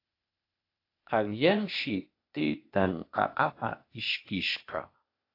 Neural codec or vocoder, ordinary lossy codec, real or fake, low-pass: codec, 16 kHz, 0.8 kbps, ZipCodec; AAC, 32 kbps; fake; 5.4 kHz